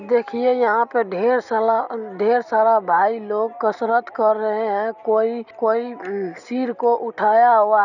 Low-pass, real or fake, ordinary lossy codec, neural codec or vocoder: 7.2 kHz; real; none; none